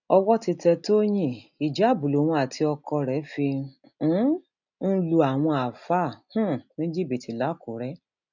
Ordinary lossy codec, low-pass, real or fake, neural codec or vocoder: none; 7.2 kHz; real; none